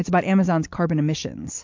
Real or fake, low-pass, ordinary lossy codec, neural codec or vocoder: real; 7.2 kHz; MP3, 48 kbps; none